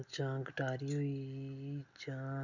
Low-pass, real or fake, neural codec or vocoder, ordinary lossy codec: 7.2 kHz; real; none; none